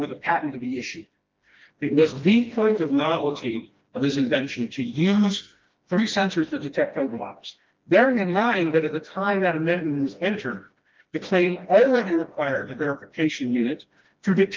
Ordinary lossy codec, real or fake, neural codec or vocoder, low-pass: Opus, 32 kbps; fake; codec, 16 kHz, 1 kbps, FreqCodec, smaller model; 7.2 kHz